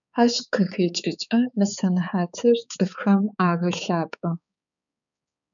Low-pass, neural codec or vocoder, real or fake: 7.2 kHz; codec, 16 kHz, 4 kbps, X-Codec, HuBERT features, trained on balanced general audio; fake